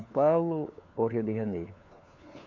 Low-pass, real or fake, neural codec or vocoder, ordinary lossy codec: 7.2 kHz; fake; codec, 16 kHz, 4 kbps, FunCodec, trained on LibriTTS, 50 frames a second; MP3, 48 kbps